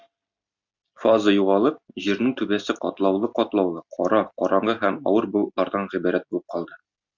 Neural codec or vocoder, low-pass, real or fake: none; 7.2 kHz; real